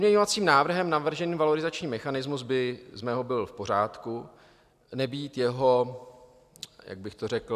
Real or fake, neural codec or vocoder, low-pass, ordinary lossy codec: real; none; 14.4 kHz; AAC, 96 kbps